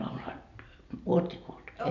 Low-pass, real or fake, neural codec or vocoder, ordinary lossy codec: 7.2 kHz; real; none; none